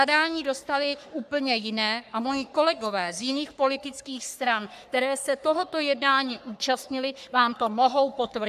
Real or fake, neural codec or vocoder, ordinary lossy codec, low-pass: fake; codec, 44.1 kHz, 3.4 kbps, Pupu-Codec; AAC, 96 kbps; 14.4 kHz